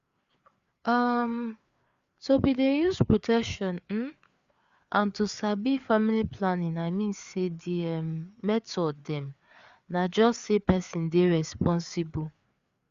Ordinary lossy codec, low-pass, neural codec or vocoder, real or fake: Opus, 64 kbps; 7.2 kHz; codec, 16 kHz, 4 kbps, FreqCodec, larger model; fake